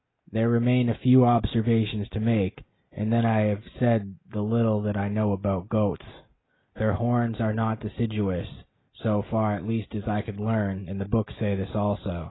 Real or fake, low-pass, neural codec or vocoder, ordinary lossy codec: real; 7.2 kHz; none; AAC, 16 kbps